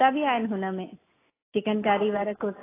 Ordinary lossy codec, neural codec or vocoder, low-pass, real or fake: AAC, 16 kbps; none; 3.6 kHz; real